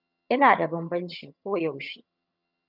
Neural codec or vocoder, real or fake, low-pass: vocoder, 22.05 kHz, 80 mel bands, HiFi-GAN; fake; 5.4 kHz